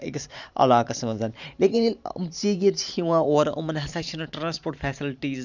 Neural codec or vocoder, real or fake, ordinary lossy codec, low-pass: vocoder, 44.1 kHz, 128 mel bands every 512 samples, BigVGAN v2; fake; none; 7.2 kHz